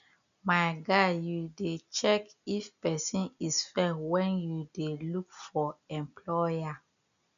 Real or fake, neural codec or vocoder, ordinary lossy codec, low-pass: real; none; none; 7.2 kHz